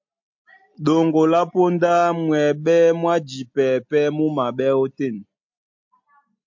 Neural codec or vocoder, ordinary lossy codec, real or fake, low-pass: none; MP3, 48 kbps; real; 7.2 kHz